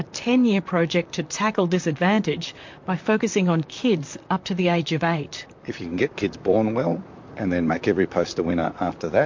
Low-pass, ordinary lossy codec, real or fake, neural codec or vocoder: 7.2 kHz; MP3, 48 kbps; fake; vocoder, 44.1 kHz, 128 mel bands, Pupu-Vocoder